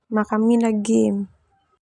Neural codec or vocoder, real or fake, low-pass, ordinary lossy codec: none; real; 10.8 kHz; none